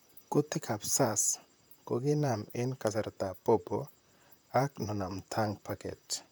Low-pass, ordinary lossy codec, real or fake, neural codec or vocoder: none; none; fake; vocoder, 44.1 kHz, 128 mel bands, Pupu-Vocoder